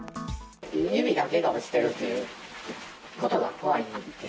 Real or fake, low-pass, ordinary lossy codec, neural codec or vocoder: real; none; none; none